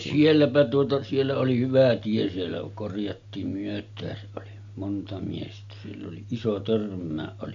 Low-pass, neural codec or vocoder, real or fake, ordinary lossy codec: 7.2 kHz; none; real; MP3, 64 kbps